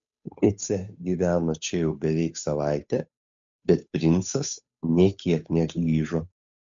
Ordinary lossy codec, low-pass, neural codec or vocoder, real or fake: MP3, 64 kbps; 7.2 kHz; codec, 16 kHz, 8 kbps, FunCodec, trained on Chinese and English, 25 frames a second; fake